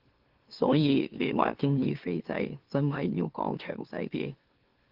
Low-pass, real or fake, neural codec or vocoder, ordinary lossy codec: 5.4 kHz; fake; autoencoder, 44.1 kHz, a latent of 192 numbers a frame, MeloTTS; Opus, 16 kbps